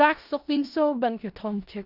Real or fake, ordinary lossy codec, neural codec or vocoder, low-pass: fake; none; codec, 16 kHz in and 24 kHz out, 0.4 kbps, LongCat-Audio-Codec, four codebook decoder; 5.4 kHz